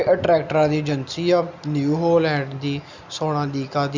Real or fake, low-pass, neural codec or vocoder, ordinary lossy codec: real; 7.2 kHz; none; Opus, 64 kbps